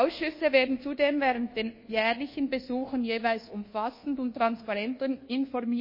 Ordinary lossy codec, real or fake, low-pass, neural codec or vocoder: MP3, 24 kbps; fake; 5.4 kHz; codec, 24 kHz, 1.2 kbps, DualCodec